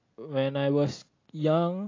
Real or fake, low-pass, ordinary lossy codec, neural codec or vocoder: real; 7.2 kHz; AAC, 32 kbps; none